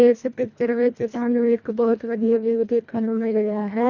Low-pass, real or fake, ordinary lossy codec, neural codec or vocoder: 7.2 kHz; fake; none; codec, 24 kHz, 1.5 kbps, HILCodec